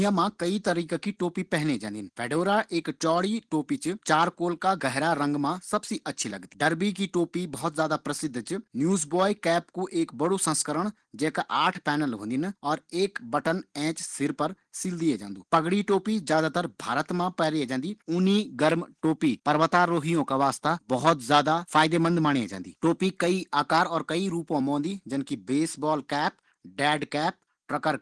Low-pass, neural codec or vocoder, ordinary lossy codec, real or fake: 9.9 kHz; none; Opus, 16 kbps; real